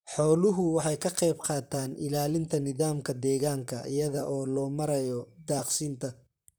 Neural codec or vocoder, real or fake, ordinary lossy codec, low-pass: vocoder, 44.1 kHz, 128 mel bands, Pupu-Vocoder; fake; none; none